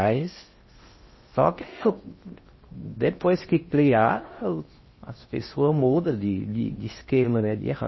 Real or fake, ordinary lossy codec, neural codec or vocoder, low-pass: fake; MP3, 24 kbps; codec, 16 kHz in and 24 kHz out, 0.6 kbps, FocalCodec, streaming, 4096 codes; 7.2 kHz